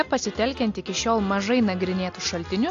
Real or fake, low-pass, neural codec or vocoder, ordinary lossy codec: real; 7.2 kHz; none; AAC, 48 kbps